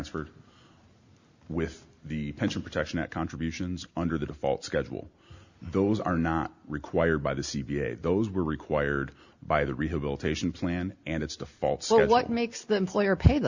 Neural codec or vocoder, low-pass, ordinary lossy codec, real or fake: none; 7.2 kHz; Opus, 64 kbps; real